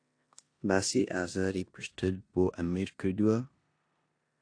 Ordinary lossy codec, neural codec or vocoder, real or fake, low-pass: AAC, 48 kbps; codec, 16 kHz in and 24 kHz out, 0.9 kbps, LongCat-Audio-Codec, four codebook decoder; fake; 9.9 kHz